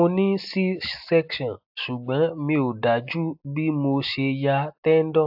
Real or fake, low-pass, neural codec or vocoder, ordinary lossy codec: real; 5.4 kHz; none; AAC, 48 kbps